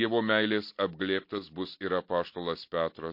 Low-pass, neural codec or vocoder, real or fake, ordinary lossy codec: 5.4 kHz; none; real; MP3, 32 kbps